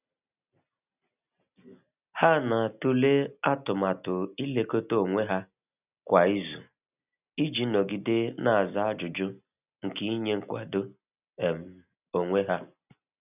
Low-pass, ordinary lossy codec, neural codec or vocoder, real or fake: 3.6 kHz; none; none; real